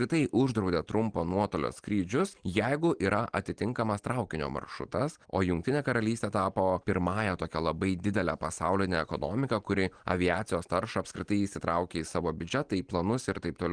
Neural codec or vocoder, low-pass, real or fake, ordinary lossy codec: none; 9.9 kHz; real; Opus, 24 kbps